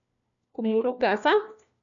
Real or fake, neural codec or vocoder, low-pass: fake; codec, 16 kHz, 1 kbps, FunCodec, trained on LibriTTS, 50 frames a second; 7.2 kHz